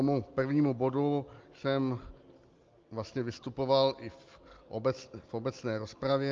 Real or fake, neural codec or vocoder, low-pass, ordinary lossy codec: real; none; 7.2 kHz; Opus, 24 kbps